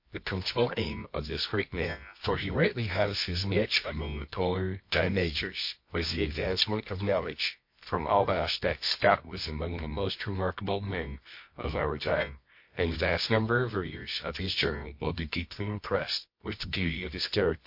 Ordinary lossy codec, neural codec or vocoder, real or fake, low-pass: MP3, 32 kbps; codec, 24 kHz, 0.9 kbps, WavTokenizer, medium music audio release; fake; 5.4 kHz